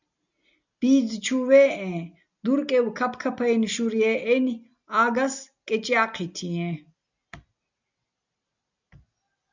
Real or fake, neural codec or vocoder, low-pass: real; none; 7.2 kHz